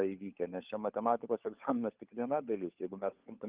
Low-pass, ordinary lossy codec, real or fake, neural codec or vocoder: 3.6 kHz; Opus, 24 kbps; fake; codec, 16 kHz, 16 kbps, FunCodec, trained on LibriTTS, 50 frames a second